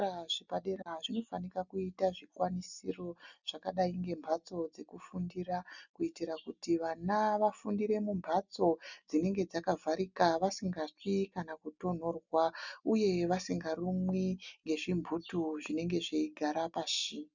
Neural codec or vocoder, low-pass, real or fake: none; 7.2 kHz; real